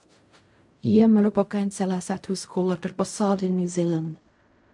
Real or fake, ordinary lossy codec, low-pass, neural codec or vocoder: fake; MP3, 64 kbps; 10.8 kHz; codec, 16 kHz in and 24 kHz out, 0.4 kbps, LongCat-Audio-Codec, fine tuned four codebook decoder